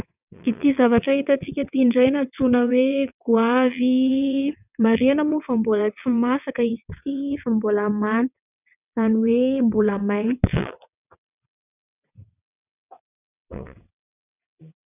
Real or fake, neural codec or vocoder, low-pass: fake; vocoder, 22.05 kHz, 80 mel bands, WaveNeXt; 3.6 kHz